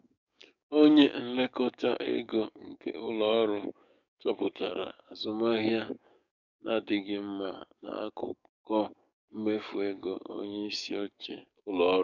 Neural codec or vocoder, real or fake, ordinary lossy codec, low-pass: codec, 44.1 kHz, 7.8 kbps, DAC; fake; none; 7.2 kHz